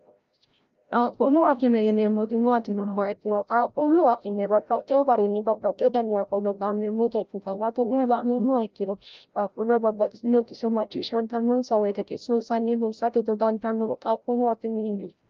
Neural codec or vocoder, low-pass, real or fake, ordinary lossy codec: codec, 16 kHz, 0.5 kbps, FreqCodec, larger model; 7.2 kHz; fake; Opus, 24 kbps